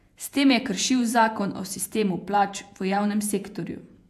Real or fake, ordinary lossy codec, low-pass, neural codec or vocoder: fake; AAC, 96 kbps; 14.4 kHz; vocoder, 48 kHz, 128 mel bands, Vocos